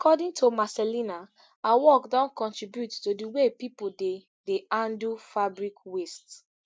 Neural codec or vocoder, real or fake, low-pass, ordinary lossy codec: none; real; none; none